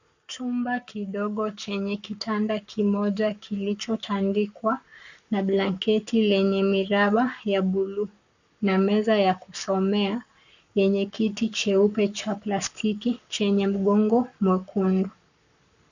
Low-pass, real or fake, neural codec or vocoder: 7.2 kHz; fake; codec, 44.1 kHz, 7.8 kbps, Pupu-Codec